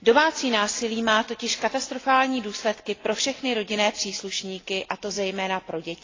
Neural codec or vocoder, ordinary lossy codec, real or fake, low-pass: none; AAC, 32 kbps; real; 7.2 kHz